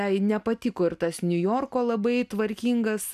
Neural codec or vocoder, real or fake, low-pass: none; real; 14.4 kHz